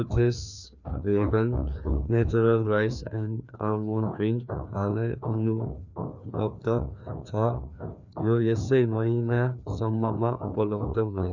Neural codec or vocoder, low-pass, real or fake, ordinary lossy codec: codec, 16 kHz, 2 kbps, FreqCodec, larger model; 7.2 kHz; fake; none